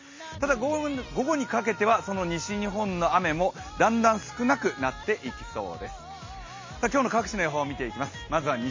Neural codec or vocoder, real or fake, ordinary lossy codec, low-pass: none; real; MP3, 32 kbps; 7.2 kHz